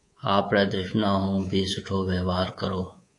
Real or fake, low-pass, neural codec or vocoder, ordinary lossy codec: fake; 10.8 kHz; codec, 24 kHz, 3.1 kbps, DualCodec; AAC, 48 kbps